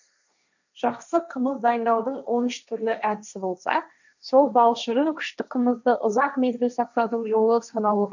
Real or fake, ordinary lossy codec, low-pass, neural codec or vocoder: fake; none; 7.2 kHz; codec, 16 kHz, 1.1 kbps, Voila-Tokenizer